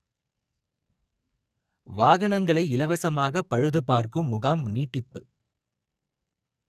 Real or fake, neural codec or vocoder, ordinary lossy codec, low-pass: fake; codec, 44.1 kHz, 2.6 kbps, SNAC; none; 14.4 kHz